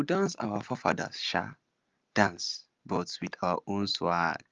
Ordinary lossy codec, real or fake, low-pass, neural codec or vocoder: Opus, 32 kbps; real; 7.2 kHz; none